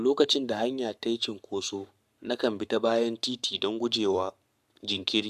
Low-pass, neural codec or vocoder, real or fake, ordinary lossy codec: 14.4 kHz; codec, 44.1 kHz, 7.8 kbps, Pupu-Codec; fake; AAC, 96 kbps